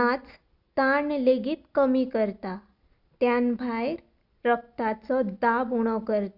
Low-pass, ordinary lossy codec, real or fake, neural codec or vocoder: 5.4 kHz; Opus, 64 kbps; real; none